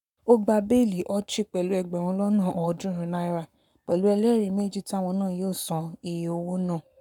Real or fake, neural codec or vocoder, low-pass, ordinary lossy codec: fake; codec, 44.1 kHz, 7.8 kbps, Pupu-Codec; 19.8 kHz; none